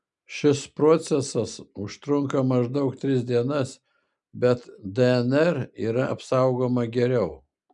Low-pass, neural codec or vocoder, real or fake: 10.8 kHz; none; real